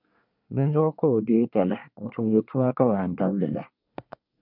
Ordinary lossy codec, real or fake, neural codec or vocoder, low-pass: MP3, 48 kbps; fake; codec, 24 kHz, 1 kbps, SNAC; 5.4 kHz